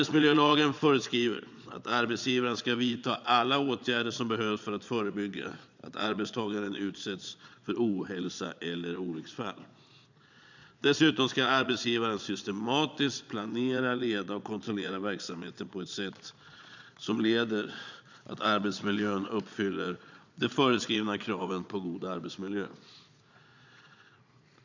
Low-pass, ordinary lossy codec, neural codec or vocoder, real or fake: 7.2 kHz; none; vocoder, 22.05 kHz, 80 mel bands, Vocos; fake